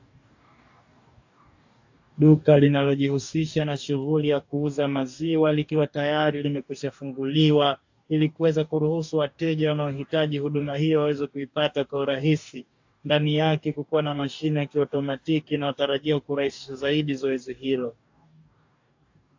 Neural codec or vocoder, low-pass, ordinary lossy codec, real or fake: codec, 44.1 kHz, 2.6 kbps, DAC; 7.2 kHz; AAC, 48 kbps; fake